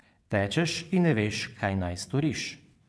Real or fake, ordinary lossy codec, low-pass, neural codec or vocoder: fake; none; none; vocoder, 22.05 kHz, 80 mel bands, WaveNeXt